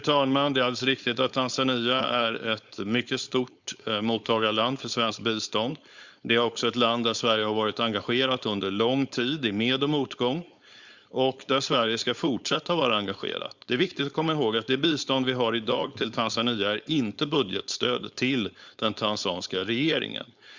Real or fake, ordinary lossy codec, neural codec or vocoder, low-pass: fake; Opus, 64 kbps; codec, 16 kHz, 4.8 kbps, FACodec; 7.2 kHz